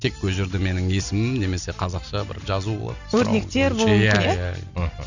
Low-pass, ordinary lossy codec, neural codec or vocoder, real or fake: 7.2 kHz; none; none; real